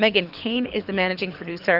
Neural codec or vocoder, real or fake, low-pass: codec, 24 kHz, 6 kbps, HILCodec; fake; 5.4 kHz